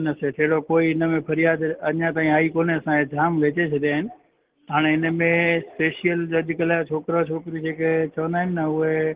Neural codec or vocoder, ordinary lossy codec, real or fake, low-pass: none; Opus, 16 kbps; real; 3.6 kHz